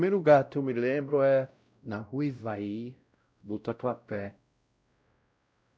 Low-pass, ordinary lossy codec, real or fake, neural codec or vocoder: none; none; fake; codec, 16 kHz, 0.5 kbps, X-Codec, WavLM features, trained on Multilingual LibriSpeech